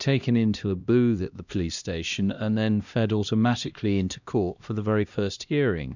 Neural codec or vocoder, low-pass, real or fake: codec, 16 kHz, 1 kbps, X-Codec, HuBERT features, trained on LibriSpeech; 7.2 kHz; fake